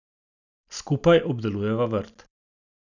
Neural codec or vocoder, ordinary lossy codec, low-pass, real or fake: none; none; 7.2 kHz; real